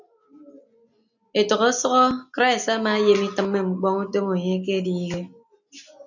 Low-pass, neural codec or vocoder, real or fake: 7.2 kHz; none; real